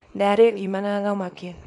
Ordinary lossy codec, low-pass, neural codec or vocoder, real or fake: none; 10.8 kHz; codec, 24 kHz, 0.9 kbps, WavTokenizer, small release; fake